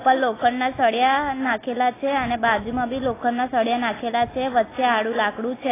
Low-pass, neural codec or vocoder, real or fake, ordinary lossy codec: 3.6 kHz; none; real; AAC, 16 kbps